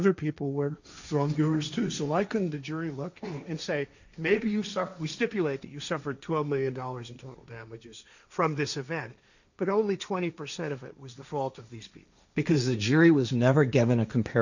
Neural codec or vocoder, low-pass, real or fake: codec, 16 kHz, 1.1 kbps, Voila-Tokenizer; 7.2 kHz; fake